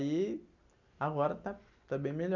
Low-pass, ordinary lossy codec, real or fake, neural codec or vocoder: 7.2 kHz; none; real; none